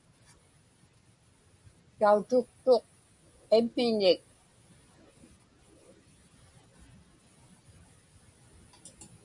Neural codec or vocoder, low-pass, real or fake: none; 10.8 kHz; real